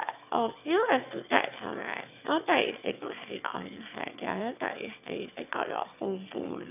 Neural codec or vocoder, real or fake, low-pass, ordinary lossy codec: autoencoder, 22.05 kHz, a latent of 192 numbers a frame, VITS, trained on one speaker; fake; 3.6 kHz; none